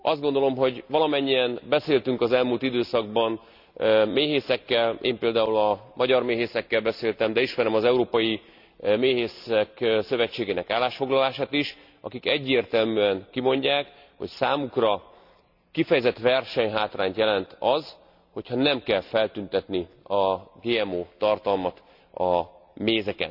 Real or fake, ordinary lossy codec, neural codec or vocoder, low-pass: real; none; none; 5.4 kHz